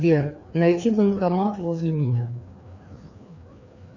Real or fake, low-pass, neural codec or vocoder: fake; 7.2 kHz; codec, 16 kHz, 2 kbps, FreqCodec, larger model